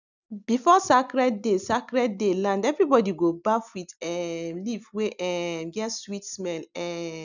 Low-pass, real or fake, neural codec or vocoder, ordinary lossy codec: 7.2 kHz; real; none; none